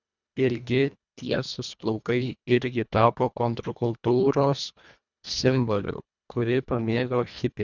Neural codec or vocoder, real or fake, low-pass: codec, 24 kHz, 1.5 kbps, HILCodec; fake; 7.2 kHz